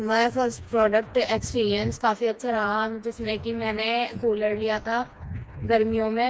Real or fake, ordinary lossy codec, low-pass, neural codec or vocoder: fake; none; none; codec, 16 kHz, 2 kbps, FreqCodec, smaller model